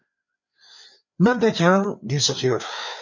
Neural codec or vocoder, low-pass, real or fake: codec, 16 kHz, 4 kbps, FreqCodec, larger model; 7.2 kHz; fake